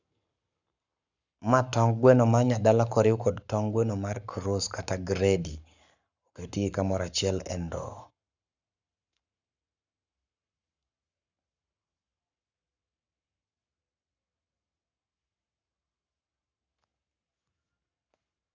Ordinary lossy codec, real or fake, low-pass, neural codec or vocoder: none; fake; 7.2 kHz; codec, 44.1 kHz, 7.8 kbps, Pupu-Codec